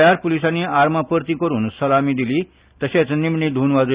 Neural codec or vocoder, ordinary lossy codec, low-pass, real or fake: none; Opus, 64 kbps; 3.6 kHz; real